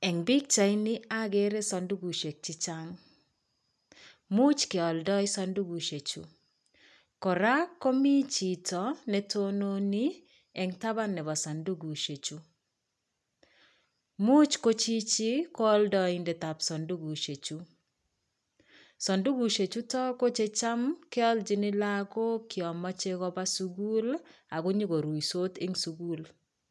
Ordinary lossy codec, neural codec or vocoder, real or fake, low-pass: none; none; real; none